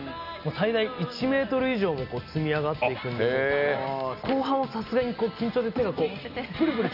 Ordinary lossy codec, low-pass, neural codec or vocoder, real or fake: none; 5.4 kHz; none; real